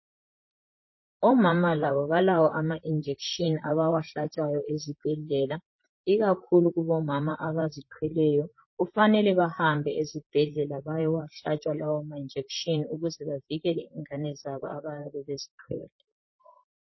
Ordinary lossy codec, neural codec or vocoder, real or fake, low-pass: MP3, 24 kbps; vocoder, 44.1 kHz, 128 mel bands, Pupu-Vocoder; fake; 7.2 kHz